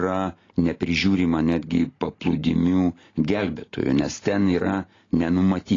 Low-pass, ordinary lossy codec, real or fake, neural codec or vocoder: 7.2 kHz; AAC, 32 kbps; real; none